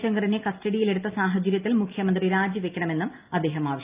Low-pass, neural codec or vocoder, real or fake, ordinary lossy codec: 3.6 kHz; none; real; Opus, 24 kbps